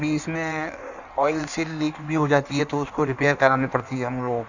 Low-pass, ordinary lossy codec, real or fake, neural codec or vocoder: 7.2 kHz; none; fake; codec, 16 kHz in and 24 kHz out, 1.1 kbps, FireRedTTS-2 codec